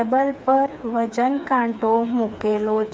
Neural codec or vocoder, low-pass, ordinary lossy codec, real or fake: codec, 16 kHz, 4 kbps, FreqCodec, smaller model; none; none; fake